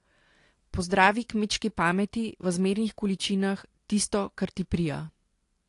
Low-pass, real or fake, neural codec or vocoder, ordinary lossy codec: 10.8 kHz; real; none; AAC, 48 kbps